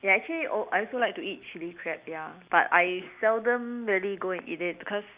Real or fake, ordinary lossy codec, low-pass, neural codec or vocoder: real; Opus, 64 kbps; 3.6 kHz; none